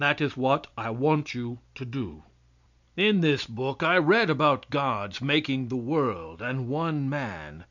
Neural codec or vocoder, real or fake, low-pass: none; real; 7.2 kHz